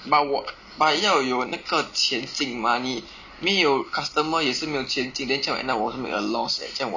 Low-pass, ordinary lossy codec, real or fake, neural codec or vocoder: 7.2 kHz; none; real; none